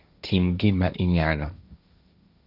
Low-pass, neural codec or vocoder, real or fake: 5.4 kHz; codec, 16 kHz, 1.1 kbps, Voila-Tokenizer; fake